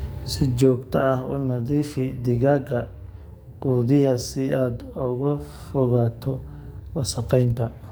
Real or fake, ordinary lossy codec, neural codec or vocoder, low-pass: fake; none; codec, 44.1 kHz, 2.6 kbps, SNAC; none